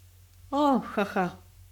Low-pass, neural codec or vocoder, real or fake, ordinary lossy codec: 19.8 kHz; codec, 44.1 kHz, 7.8 kbps, Pupu-Codec; fake; none